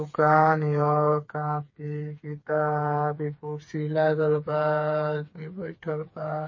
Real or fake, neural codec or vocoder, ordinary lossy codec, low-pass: fake; codec, 16 kHz, 4 kbps, FreqCodec, smaller model; MP3, 32 kbps; 7.2 kHz